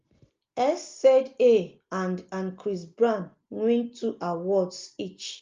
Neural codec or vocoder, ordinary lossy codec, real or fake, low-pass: none; Opus, 32 kbps; real; 7.2 kHz